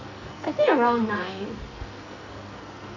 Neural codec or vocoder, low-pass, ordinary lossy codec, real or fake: codec, 44.1 kHz, 2.6 kbps, SNAC; 7.2 kHz; none; fake